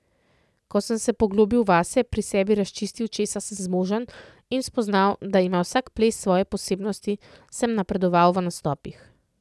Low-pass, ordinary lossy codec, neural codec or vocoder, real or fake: none; none; none; real